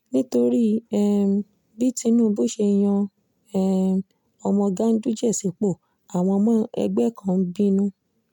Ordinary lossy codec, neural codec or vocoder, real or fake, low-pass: MP3, 96 kbps; none; real; 19.8 kHz